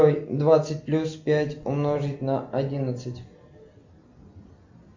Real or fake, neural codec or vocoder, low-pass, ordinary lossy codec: real; none; 7.2 kHz; MP3, 48 kbps